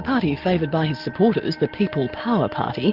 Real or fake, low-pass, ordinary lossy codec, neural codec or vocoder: real; 5.4 kHz; Opus, 32 kbps; none